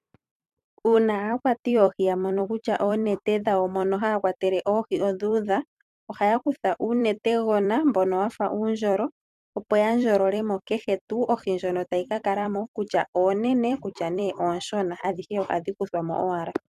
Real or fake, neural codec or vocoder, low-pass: fake; vocoder, 44.1 kHz, 128 mel bands every 256 samples, BigVGAN v2; 14.4 kHz